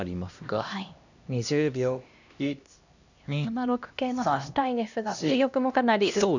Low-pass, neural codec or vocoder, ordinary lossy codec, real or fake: 7.2 kHz; codec, 16 kHz, 1 kbps, X-Codec, HuBERT features, trained on LibriSpeech; none; fake